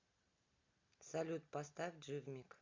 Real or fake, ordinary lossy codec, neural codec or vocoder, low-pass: real; MP3, 64 kbps; none; 7.2 kHz